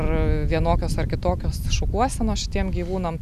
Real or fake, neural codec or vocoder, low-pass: real; none; 14.4 kHz